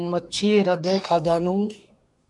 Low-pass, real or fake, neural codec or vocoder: 10.8 kHz; fake; codec, 24 kHz, 1 kbps, SNAC